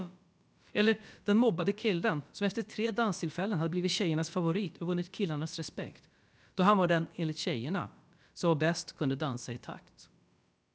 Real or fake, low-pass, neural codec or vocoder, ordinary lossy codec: fake; none; codec, 16 kHz, about 1 kbps, DyCAST, with the encoder's durations; none